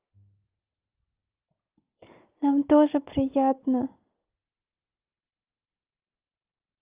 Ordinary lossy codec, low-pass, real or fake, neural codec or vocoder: Opus, 32 kbps; 3.6 kHz; real; none